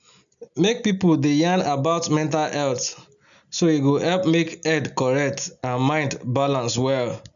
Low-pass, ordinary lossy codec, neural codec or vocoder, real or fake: 7.2 kHz; none; none; real